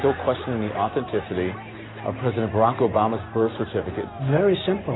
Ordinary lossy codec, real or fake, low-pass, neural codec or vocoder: AAC, 16 kbps; real; 7.2 kHz; none